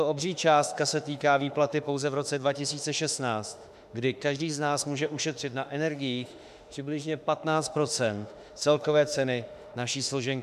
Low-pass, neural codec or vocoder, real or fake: 14.4 kHz; autoencoder, 48 kHz, 32 numbers a frame, DAC-VAE, trained on Japanese speech; fake